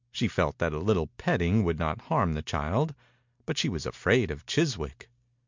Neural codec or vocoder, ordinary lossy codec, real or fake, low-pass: none; MP3, 64 kbps; real; 7.2 kHz